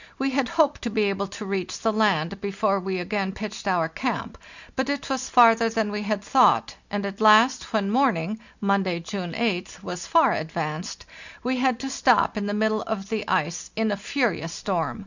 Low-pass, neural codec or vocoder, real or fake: 7.2 kHz; none; real